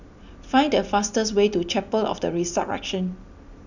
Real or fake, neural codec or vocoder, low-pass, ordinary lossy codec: real; none; 7.2 kHz; none